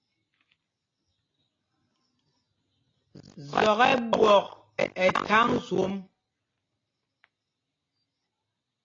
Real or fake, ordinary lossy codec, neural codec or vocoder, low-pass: real; AAC, 32 kbps; none; 7.2 kHz